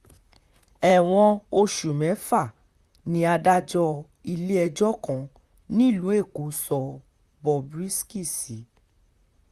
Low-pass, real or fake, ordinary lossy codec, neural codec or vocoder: 14.4 kHz; fake; Opus, 64 kbps; vocoder, 44.1 kHz, 128 mel bands, Pupu-Vocoder